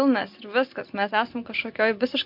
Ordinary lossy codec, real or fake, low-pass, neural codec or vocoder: MP3, 48 kbps; real; 5.4 kHz; none